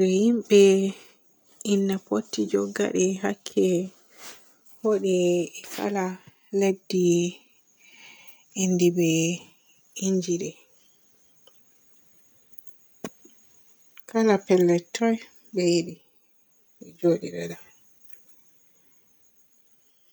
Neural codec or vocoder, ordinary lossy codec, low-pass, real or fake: none; none; none; real